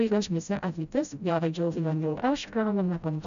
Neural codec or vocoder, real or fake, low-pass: codec, 16 kHz, 0.5 kbps, FreqCodec, smaller model; fake; 7.2 kHz